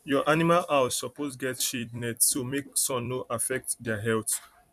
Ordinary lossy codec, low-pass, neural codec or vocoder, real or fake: none; 14.4 kHz; vocoder, 44.1 kHz, 128 mel bands every 256 samples, BigVGAN v2; fake